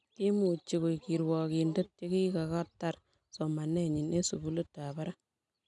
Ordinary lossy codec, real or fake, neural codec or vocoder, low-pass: none; real; none; 10.8 kHz